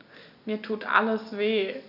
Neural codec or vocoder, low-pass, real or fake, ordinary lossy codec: none; 5.4 kHz; real; none